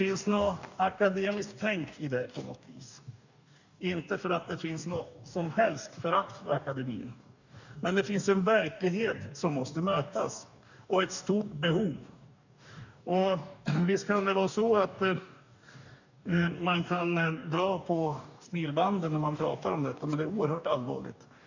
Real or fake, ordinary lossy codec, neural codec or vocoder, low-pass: fake; none; codec, 44.1 kHz, 2.6 kbps, DAC; 7.2 kHz